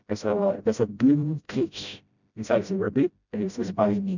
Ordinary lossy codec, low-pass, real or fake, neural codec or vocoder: none; 7.2 kHz; fake; codec, 16 kHz, 0.5 kbps, FreqCodec, smaller model